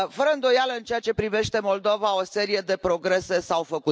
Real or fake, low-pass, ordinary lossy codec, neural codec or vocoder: real; none; none; none